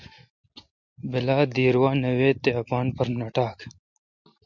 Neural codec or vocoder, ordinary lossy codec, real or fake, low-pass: none; MP3, 64 kbps; real; 7.2 kHz